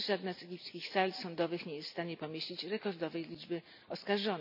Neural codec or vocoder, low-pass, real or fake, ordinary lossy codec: none; 5.4 kHz; real; none